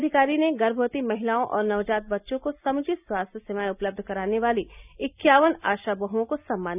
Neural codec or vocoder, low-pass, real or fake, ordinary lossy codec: none; 3.6 kHz; real; none